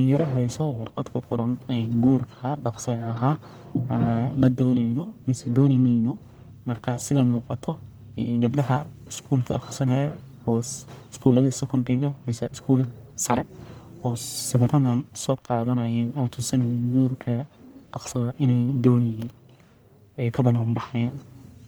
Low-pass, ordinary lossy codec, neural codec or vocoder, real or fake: none; none; codec, 44.1 kHz, 1.7 kbps, Pupu-Codec; fake